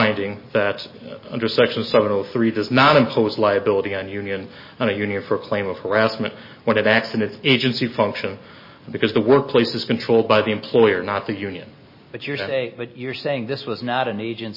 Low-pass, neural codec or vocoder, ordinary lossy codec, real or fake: 5.4 kHz; none; MP3, 24 kbps; real